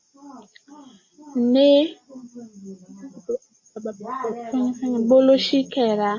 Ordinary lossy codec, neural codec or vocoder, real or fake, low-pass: MP3, 32 kbps; none; real; 7.2 kHz